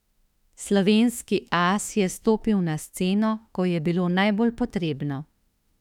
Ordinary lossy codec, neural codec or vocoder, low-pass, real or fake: none; autoencoder, 48 kHz, 32 numbers a frame, DAC-VAE, trained on Japanese speech; 19.8 kHz; fake